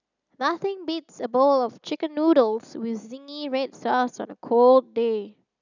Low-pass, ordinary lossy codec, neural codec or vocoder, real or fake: 7.2 kHz; none; none; real